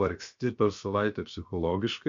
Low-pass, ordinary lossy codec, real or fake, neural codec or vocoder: 7.2 kHz; MP3, 48 kbps; fake; codec, 16 kHz, 0.7 kbps, FocalCodec